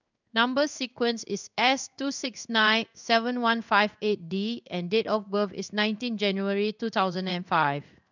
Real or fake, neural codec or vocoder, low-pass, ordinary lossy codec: fake; codec, 16 kHz in and 24 kHz out, 1 kbps, XY-Tokenizer; 7.2 kHz; none